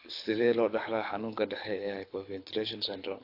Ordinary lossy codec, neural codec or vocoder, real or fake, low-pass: AAC, 32 kbps; codec, 24 kHz, 6 kbps, HILCodec; fake; 5.4 kHz